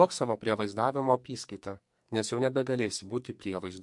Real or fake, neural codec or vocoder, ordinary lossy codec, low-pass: fake; codec, 44.1 kHz, 2.6 kbps, SNAC; MP3, 64 kbps; 10.8 kHz